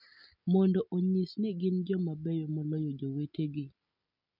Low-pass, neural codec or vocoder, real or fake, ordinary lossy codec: 5.4 kHz; none; real; none